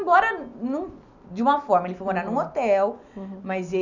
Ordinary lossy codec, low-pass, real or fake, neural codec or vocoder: none; 7.2 kHz; real; none